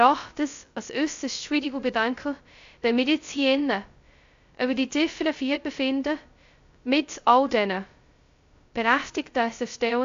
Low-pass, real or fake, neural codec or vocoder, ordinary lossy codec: 7.2 kHz; fake; codec, 16 kHz, 0.2 kbps, FocalCodec; AAC, 64 kbps